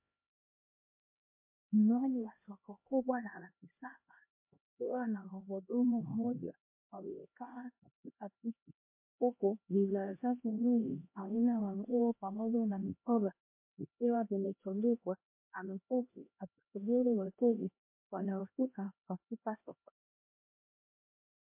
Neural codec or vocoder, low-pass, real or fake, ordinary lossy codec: codec, 16 kHz, 1 kbps, X-Codec, HuBERT features, trained on LibriSpeech; 3.6 kHz; fake; MP3, 24 kbps